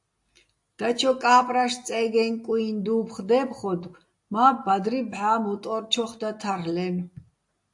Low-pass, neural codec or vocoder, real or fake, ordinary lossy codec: 10.8 kHz; none; real; AAC, 64 kbps